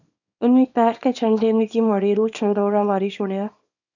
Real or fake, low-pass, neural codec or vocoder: fake; 7.2 kHz; codec, 24 kHz, 0.9 kbps, WavTokenizer, small release